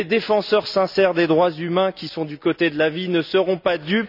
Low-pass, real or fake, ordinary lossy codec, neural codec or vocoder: 5.4 kHz; real; none; none